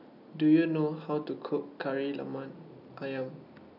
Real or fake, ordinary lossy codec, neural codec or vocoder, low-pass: real; none; none; 5.4 kHz